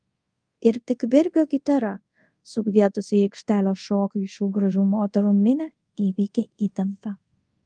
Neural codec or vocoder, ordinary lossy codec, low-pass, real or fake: codec, 24 kHz, 0.5 kbps, DualCodec; Opus, 32 kbps; 9.9 kHz; fake